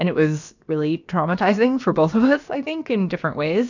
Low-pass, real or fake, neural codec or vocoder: 7.2 kHz; fake; codec, 16 kHz, about 1 kbps, DyCAST, with the encoder's durations